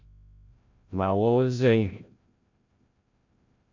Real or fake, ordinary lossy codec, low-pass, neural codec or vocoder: fake; MP3, 48 kbps; 7.2 kHz; codec, 16 kHz, 0.5 kbps, FreqCodec, larger model